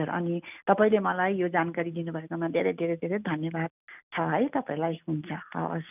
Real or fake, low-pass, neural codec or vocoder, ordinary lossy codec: real; 3.6 kHz; none; none